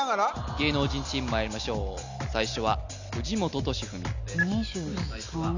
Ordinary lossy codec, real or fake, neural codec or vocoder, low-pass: none; real; none; 7.2 kHz